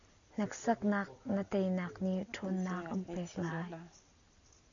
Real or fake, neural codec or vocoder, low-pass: real; none; 7.2 kHz